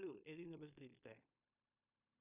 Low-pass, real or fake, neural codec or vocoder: 3.6 kHz; fake; codec, 16 kHz in and 24 kHz out, 0.9 kbps, LongCat-Audio-Codec, fine tuned four codebook decoder